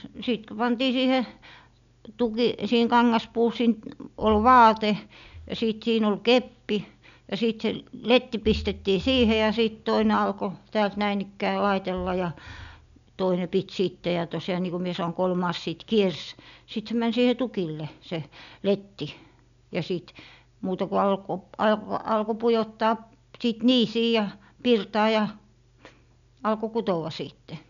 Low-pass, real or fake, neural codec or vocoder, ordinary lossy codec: 7.2 kHz; real; none; none